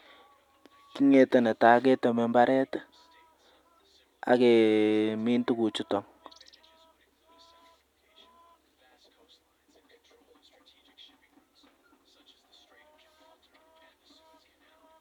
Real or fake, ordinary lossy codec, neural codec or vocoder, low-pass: real; none; none; 19.8 kHz